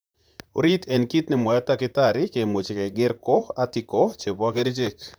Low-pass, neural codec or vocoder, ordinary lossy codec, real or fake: none; vocoder, 44.1 kHz, 128 mel bands, Pupu-Vocoder; none; fake